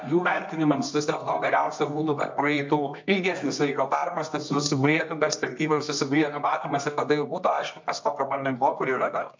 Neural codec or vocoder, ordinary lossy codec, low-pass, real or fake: codec, 24 kHz, 0.9 kbps, WavTokenizer, medium music audio release; MP3, 48 kbps; 7.2 kHz; fake